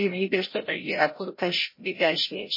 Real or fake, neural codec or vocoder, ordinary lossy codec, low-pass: fake; codec, 16 kHz, 0.5 kbps, FreqCodec, larger model; MP3, 24 kbps; 5.4 kHz